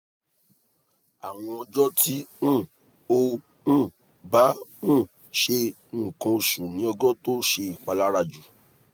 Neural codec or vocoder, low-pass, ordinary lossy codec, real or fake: none; none; none; real